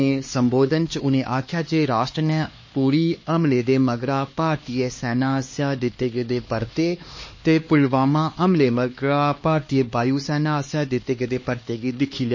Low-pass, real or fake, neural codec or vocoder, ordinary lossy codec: 7.2 kHz; fake; codec, 16 kHz, 2 kbps, X-Codec, HuBERT features, trained on LibriSpeech; MP3, 32 kbps